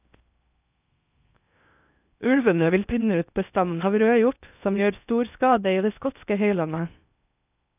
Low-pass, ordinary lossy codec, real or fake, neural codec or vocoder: 3.6 kHz; none; fake; codec, 16 kHz in and 24 kHz out, 0.6 kbps, FocalCodec, streaming, 4096 codes